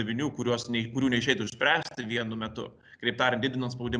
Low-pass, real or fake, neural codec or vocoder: 9.9 kHz; real; none